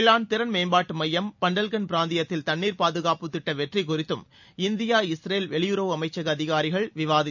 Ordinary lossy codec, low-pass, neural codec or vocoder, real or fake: none; 7.2 kHz; none; real